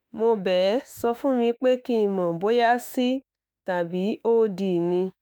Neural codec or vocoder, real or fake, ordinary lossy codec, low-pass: autoencoder, 48 kHz, 32 numbers a frame, DAC-VAE, trained on Japanese speech; fake; none; none